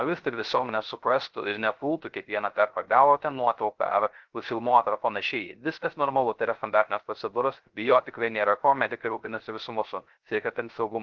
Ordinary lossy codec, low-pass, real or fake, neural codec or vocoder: Opus, 16 kbps; 7.2 kHz; fake; codec, 16 kHz, 0.3 kbps, FocalCodec